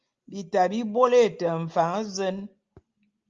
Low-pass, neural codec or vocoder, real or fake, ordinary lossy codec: 7.2 kHz; none; real; Opus, 24 kbps